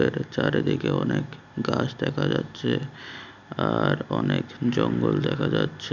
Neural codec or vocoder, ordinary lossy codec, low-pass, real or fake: none; none; 7.2 kHz; real